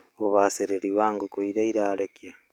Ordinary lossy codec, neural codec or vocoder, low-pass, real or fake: none; codec, 44.1 kHz, 7.8 kbps, DAC; 19.8 kHz; fake